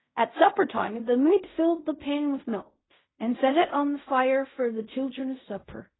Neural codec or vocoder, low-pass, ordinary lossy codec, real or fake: codec, 16 kHz in and 24 kHz out, 0.4 kbps, LongCat-Audio-Codec, fine tuned four codebook decoder; 7.2 kHz; AAC, 16 kbps; fake